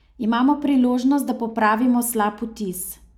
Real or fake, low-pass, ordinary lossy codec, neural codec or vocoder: real; 19.8 kHz; none; none